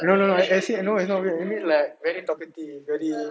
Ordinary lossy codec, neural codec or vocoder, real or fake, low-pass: none; none; real; none